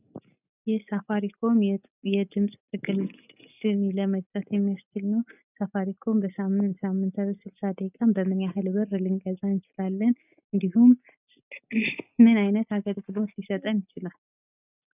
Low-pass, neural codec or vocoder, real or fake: 3.6 kHz; none; real